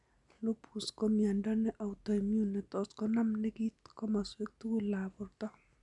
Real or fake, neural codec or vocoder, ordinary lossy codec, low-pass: real; none; none; 10.8 kHz